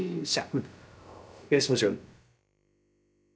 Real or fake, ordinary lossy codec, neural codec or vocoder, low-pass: fake; none; codec, 16 kHz, about 1 kbps, DyCAST, with the encoder's durations; none